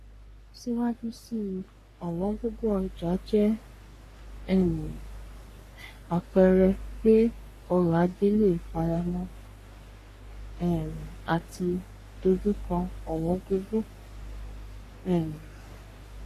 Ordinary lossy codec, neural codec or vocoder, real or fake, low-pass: AAC, 48 kbps; codec, 44.1 kHz, 3.4 kbps, Pupu-Codec; fake; 14.4 kHz